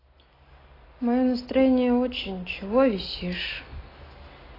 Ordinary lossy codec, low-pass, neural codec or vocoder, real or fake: AAC, 24 kbps; 5.4 kHz; none; real